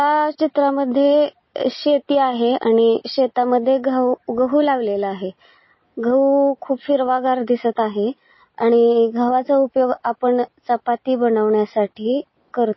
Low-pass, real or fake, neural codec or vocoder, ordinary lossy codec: 7.2 kHz; real; none; MP3, 24 kbps